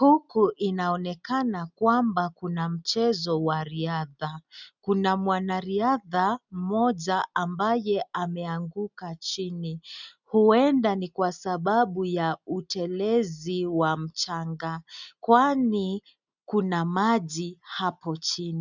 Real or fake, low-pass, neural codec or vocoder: real; 7.2 kHz; none